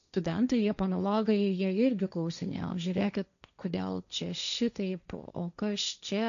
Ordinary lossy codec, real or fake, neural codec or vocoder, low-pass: MP3, 96 kbps; fake; codec, 16 kHz, 1.1 kbps, Voila-Tokenizer; 7.2 kHz